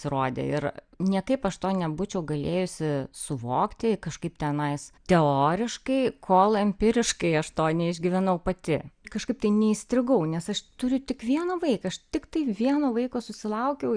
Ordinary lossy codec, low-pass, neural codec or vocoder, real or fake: Opus, 64 kbps; 9.9 kHz; none; real